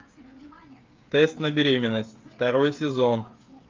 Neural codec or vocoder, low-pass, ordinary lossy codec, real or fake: codec, 16 kHz, 8 kbps, FreqCodec, smaller model; 7.2 kHz; Opus, 32 kbps; fake